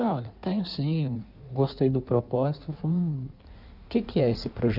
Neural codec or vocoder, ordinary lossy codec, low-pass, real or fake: codec, 16 kHz in and 24 kHz out, 1.1 kbps, FireRedTTS-2 codec; none; 5.4 kHz; fake